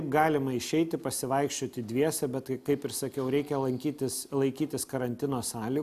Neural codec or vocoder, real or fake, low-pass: none; real; 14.4 kHz